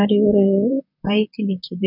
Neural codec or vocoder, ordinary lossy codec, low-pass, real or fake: vocoder, 44.1 kHz, 128 mel bands, Pupu-Vocoder; none; 5.4 kHz; fake